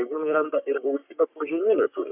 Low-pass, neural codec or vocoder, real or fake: 3.6 kHz; codec, 44.1 kHz, 3.4 kbps, Pupu-Codec; fake